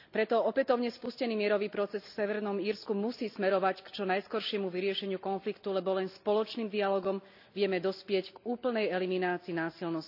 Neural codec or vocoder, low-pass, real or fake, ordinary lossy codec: none; 5.4 kHz; real; none